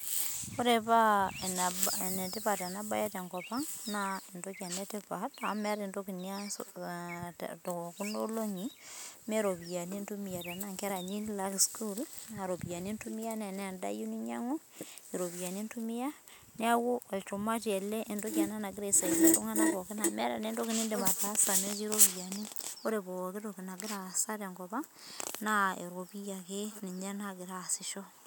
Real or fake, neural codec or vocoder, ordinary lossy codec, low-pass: real; none; none; none